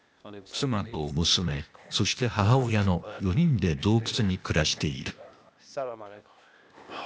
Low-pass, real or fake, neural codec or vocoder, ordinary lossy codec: none; fake; codec, 16 kHz, 0.8 kbps, ZipCodec; none